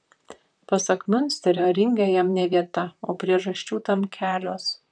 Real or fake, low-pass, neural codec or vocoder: fake; 9.9 kHz; vocoder, 44.1 kHz, 128 mel bands, Pupu-Vocoder